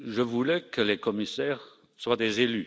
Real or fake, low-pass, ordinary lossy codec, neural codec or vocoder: real; none; none; none